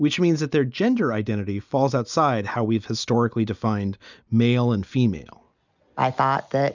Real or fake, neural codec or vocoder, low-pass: real; none; 7.2 kHz